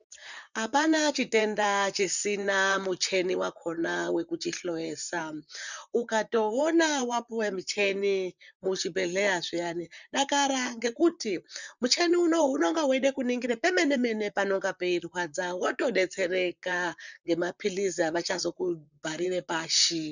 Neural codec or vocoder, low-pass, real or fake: vocoder, 44.1 kHz, 128 mel bands, Pupu-Vocoder; 7.2 kHz; fake